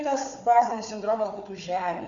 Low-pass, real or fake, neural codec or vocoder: 7.2 kHz; fake; codec, 16 kHz, 4 kbps, FunCodec, trained on Chinese and English, 50 frames a second